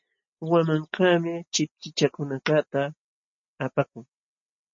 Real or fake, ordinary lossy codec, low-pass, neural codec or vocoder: real; MP3, 32 kbps; 7.2 kHz; none